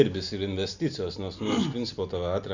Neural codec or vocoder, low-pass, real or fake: none; 7.2 kHz; real